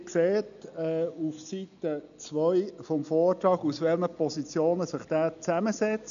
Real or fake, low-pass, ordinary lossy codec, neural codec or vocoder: real; 7.2 kHz; none; none